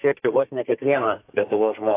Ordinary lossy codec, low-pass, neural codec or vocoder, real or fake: AAC, 24 kbps; 3.6 kHz; codec, 32 kHz, 1.9 kbps, SNAC; fake